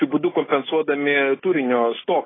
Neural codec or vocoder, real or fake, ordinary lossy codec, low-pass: none; real; AAC, 16 kbps; 7.2 kHz